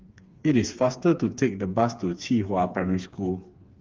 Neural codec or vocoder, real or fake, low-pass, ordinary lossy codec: codec, 16 kHz, 4 kbps, FreqCodec, smaller model; fake; 7.2 kHz; Opus, 32 kbps